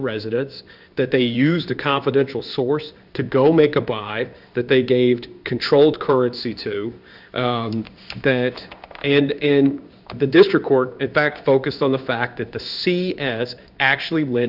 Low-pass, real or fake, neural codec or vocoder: 5.4 kHz; fake; codec, 16 kHz in and 24 kHz out, 1 kbps, XY-Tokenizer